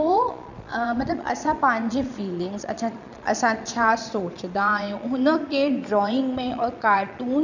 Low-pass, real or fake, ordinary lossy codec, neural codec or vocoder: 7.2 kHz; fake; none; vocoder, 22.05 kHz, 80 mel bands, Vocos